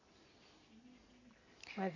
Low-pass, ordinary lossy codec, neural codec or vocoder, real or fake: 7.2 kHz; none; none; real